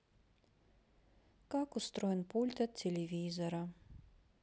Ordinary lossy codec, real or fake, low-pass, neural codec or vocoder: none; real; none; none